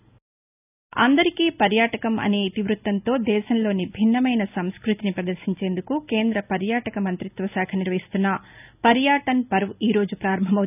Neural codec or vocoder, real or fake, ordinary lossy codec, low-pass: none; real; none; 3.6 kHz